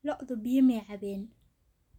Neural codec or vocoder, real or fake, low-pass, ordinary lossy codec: none; real; 19.8 kHz; none